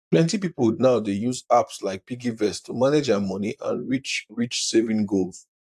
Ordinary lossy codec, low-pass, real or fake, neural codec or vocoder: AAC, 96 kbps; 14.4 kHz; fake; vocoder, 44.1 kHz, 128 mel bands, Pupu-Vocoder